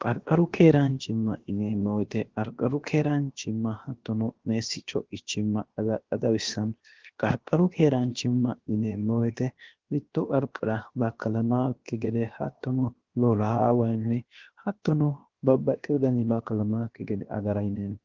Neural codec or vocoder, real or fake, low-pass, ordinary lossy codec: codec, 16 kHz, 0.7 kbps, FocalCodec; fake; 7.2 kHz; Opus, 16 kbps